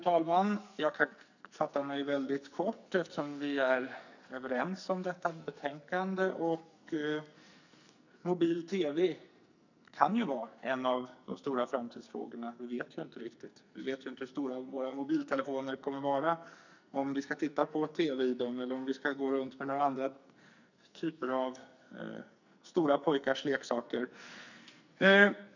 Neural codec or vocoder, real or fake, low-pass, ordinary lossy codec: codec, 44.1 kHz, 2.6 kbps, SNAC; fake; 7.2 kHz; none